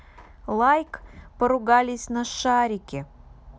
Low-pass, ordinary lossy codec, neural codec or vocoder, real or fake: none; none; none; real